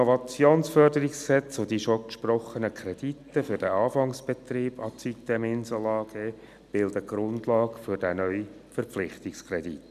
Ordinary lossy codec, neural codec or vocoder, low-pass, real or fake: none; none; 14.4 kHz; real